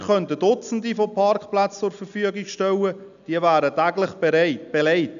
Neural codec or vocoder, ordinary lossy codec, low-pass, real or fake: none; none; 7.2 kHz; real